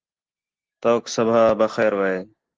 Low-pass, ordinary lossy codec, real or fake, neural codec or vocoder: 7.2 kHz; Opus, 16 kbps; real; none